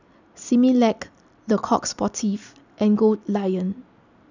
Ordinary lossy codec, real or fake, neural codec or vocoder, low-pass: none; real; none; 7.2 kHz